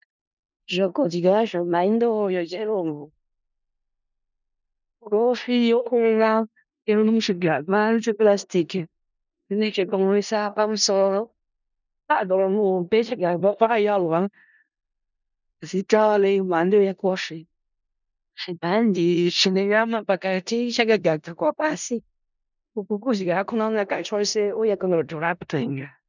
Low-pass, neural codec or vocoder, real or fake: 7.2 kHz; codec, 16 kHz in and 24 kHz out, 0.4 kbps, LongCat-Audio-Codec, four codebook decoder; fake